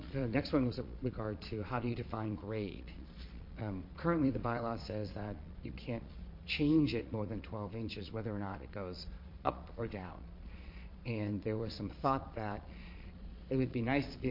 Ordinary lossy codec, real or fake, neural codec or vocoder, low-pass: MP3, 32 kbps; fake; vocoder, 22.05 kHz, 80 mel bands, Vocos; 5.4 kHz